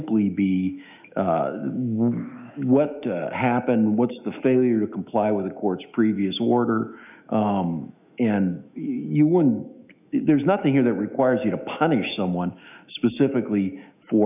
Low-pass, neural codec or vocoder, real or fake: 3.6 kHz; autoencoder, 48 kHz, 128 numbers a frame, DAC-VAE, trained on Japanese speech; fake